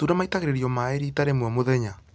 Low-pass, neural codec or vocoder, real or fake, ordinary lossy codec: none; none; real; none